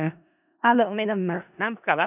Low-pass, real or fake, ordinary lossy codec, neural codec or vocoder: 3.6 kHz; fake; none; codec, 16 kHz in and 24 kHz out, 0.4 kbps, LongCat-Audio-Codec, four codebook decoder